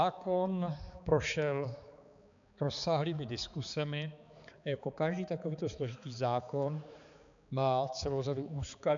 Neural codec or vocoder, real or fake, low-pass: codec, 16 kHz, 4 kbps, X-Codec, HuBERT features, trained on balanced general audio; fake; 7.2 kHz